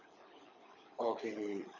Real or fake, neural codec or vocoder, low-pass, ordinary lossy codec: fake; codec, 24 kHz, 6 kbps, HILCodec; 7.2 kHz; MP3, 32 kbps